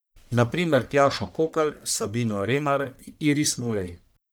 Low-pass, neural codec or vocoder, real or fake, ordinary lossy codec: none; codec, 44.1 kHz, 1.7 kbps, Pupu-Codec; fake; none